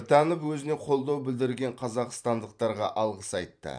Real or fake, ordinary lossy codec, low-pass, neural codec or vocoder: fake; none; 9.9 kHz; vocoder, 44.1 kHz, 128 mel bands every 512 samples, BigVGAN v2